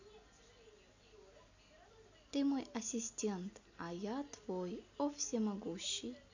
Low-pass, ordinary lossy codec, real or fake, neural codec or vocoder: 7.2 kHz; none; real; none